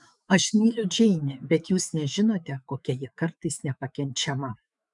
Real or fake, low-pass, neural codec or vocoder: fake; 10.8 kHz; autoencoder, 48 kHz, 128 numbers a frame, DAC-VAE, trained on Japanese speech